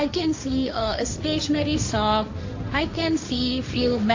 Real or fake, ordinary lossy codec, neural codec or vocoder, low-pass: fake; AAC, 48 kbps; codec, 16 kHz, 1.1 kbps, Voila-Tokenizer; 7.2 kHz